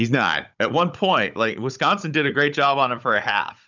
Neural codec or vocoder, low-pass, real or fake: codec, 16 kHz, 16 kbps, FunCodec, trained on Chinese and English, 50 frames a second; 7.2 kHz; fake